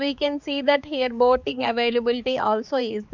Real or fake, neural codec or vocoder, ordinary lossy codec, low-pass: fake; codec, 16 kHz, 4 kbps, X-Codec, HuBERT features, trained on balanced general audio; none; 7.2 kHz